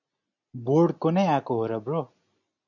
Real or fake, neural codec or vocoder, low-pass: real; none; 7.2 kHz